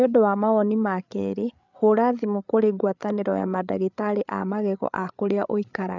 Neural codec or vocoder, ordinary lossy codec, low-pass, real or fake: codec, 16 kHz, 8 kbps, FreqCodec, larger model; none; 7.2 kHz; fake